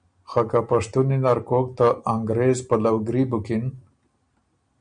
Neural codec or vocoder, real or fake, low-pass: none; real; 9.9 kHz